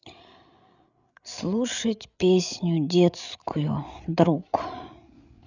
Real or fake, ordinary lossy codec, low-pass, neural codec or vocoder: fake; none; 7.2 kHz; codec, 16 kHz, 8 kbps, FreqCodec, larger model